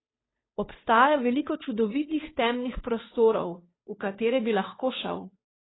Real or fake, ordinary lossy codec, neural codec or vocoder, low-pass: fake; AAC, 16 kbps; codec, 16 kHz, 2 kbps, FunCodec, trained on Chinese and English, 25 frames a second; 7.2 kHz